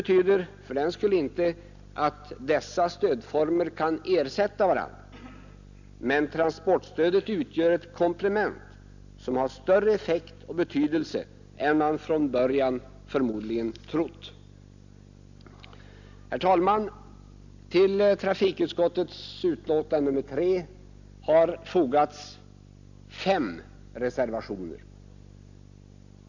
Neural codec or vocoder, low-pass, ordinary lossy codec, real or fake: none; 7.2 kHz; none; real